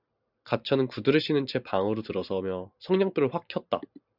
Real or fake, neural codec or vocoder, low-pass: fake; vocoder, 44.1 kHz, 128 mel bands every 512 samples, BigVGAN v2; 5.4 kHz